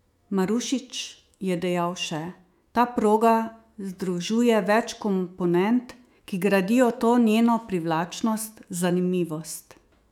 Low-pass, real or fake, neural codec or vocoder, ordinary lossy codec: 19.8 kHz; fake; autoencoder, 48 kHz, 128 numbers a frame, DAC-VAE, trained on Japanese speech; none